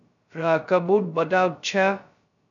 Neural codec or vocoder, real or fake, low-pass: codec, 16 kHz, 0.2 kbps, FocalCodec; fake; 7.2 kHz